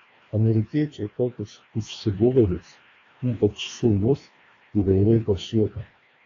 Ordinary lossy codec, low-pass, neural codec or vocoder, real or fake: AAC, 32 kbps; 7.2 kHz; codec, 16 kHz, 1 kbps, FreqCodec, larger model; fake